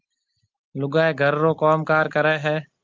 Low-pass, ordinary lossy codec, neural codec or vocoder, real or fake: 7.2 kHz; Opus, 24 kbps; none; real